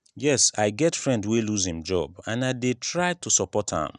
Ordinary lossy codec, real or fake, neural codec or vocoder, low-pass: none; real; none; 10.8 kHz